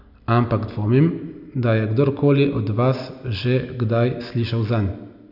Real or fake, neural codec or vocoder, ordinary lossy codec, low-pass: real; none; none; 5.4 kHz